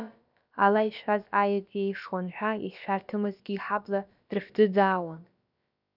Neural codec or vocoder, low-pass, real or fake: codec, 16 kHz, about 1 kbps, DyCAST, with the encoder's durations; 5.4 kHz; fake